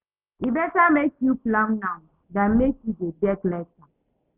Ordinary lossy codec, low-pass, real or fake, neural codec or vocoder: none; 3.6 kHz; real; none